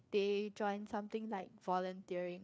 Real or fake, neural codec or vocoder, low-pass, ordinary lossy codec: fake; codec, 16 kHz, 4 kbps, FreqCodec, larger model; none; none